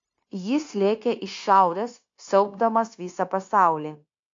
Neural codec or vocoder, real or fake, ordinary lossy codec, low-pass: codec, 16 kHz, 0.9 kbps, LongCat-Audio-Codec; fake; AAC, 48 kbps; 7.2 kHz